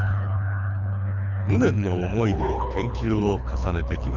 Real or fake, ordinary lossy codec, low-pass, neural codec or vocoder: fake; none; 7.2 kHz; codec, 24 kHz, 3 kbps, HILCodec